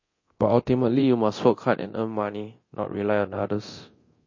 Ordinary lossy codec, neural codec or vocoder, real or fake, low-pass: MP3, 32 kbps; codec, 24 kHz, 0.9 kbps, DualCodec; fake; 7.2 kHz